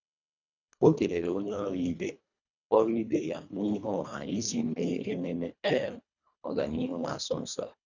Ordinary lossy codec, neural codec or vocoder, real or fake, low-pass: none; codec, 24 kHz, 1.5 kbps, HILCodec; fake; 7.2 kHz